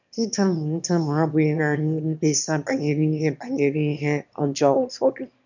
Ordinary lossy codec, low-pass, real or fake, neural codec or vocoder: none; 7.2 kHz; fake; autoencoder, 22.05 kHz, a latent of 192 numbers a frame, VITS, trained on one speaker